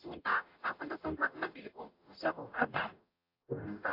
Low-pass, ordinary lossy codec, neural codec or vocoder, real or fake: 5.4 kHz; AAC, 32 kbps; codec, 44.1 kHz, 0.9 kbps, DAC; fake